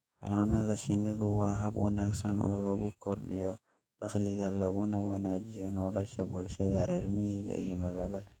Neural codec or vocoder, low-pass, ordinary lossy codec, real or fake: codec, 44.1 kHz, 2.6 kbps, DAC; 19.8 kHz; none; fake